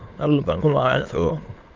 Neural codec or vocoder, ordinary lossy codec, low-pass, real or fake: autoencoder, 22.05 kHz, a latent of 192 numbers a frame, VITS, trained on many speakers; Opus, 32 kbps; 7.2 kHz; fake